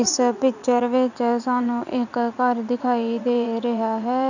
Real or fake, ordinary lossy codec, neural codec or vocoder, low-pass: fake; none; vocoder, 44.1 kHz, 80 mel bands, Vocos; 7.2 kHz